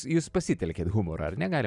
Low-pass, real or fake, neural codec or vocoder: 10.8 kHz; real; none